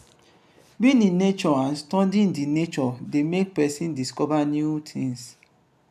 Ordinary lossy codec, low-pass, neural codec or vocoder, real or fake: none; 14.4 kHz; none; real